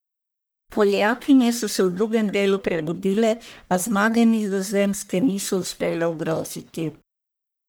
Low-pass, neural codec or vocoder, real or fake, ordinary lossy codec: none; codec, 44.1 kHz, 1.7 kbps, Pupu-Codec; fake; none